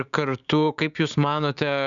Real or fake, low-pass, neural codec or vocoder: fake; 7.2 kHz; codec, 16 kHz, 6 kbps, DAC